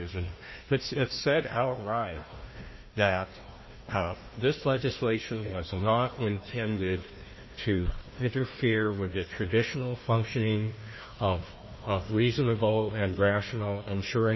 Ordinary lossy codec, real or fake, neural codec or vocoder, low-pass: MP3, 24 kbps; fake; codec, 16 kHz, 1 kbps, FunCodec, trained on Chinese and English, 50 frames a second; 7.2 kHz